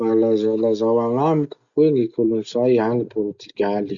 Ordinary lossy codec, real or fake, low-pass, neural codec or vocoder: Opus, 64 kbps; real; 7.2 kHz; none